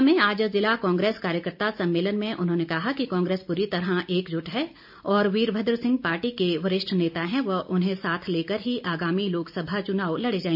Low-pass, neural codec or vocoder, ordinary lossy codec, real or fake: 5.4 kHz; none; AAC, 48 kbps; real